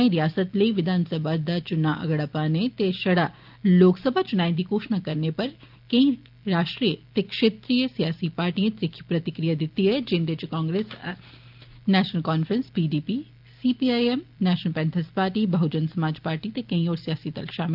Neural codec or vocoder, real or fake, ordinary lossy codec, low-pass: none; real; Opus, 32 kbps; 5.4 kHz